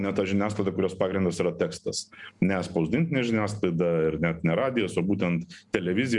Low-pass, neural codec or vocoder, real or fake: 10.8 kHz; none; real